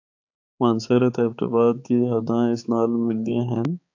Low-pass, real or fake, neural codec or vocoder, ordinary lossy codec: 7.2 kHz; fake; codec, 16 kHz, 4 kbps, X-Codec, HuBERT features, trained on balanced general audio; AAC, 48 kbps